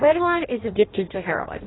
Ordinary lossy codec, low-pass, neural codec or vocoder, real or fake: AAC, 16 kbps; 7.2 kHz; codec, 16 kHz in and 24 kHz out, 0.6 kbps, FireRedTTS-2 codec; fake